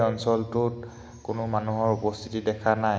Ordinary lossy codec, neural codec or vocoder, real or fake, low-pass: none; none; real; none